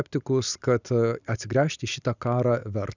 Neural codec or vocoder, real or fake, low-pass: none; real; 7.2 kHz